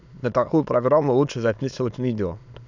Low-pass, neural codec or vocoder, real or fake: 7.2 kHz; autoencoder, 22.05 kHz, a latent of 192 numbers a frame, VITS, trained on many speakers; fake